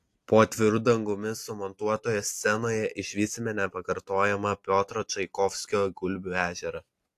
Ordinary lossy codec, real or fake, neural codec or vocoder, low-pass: AAC, 64 kbps; real; none; 14.4 kHz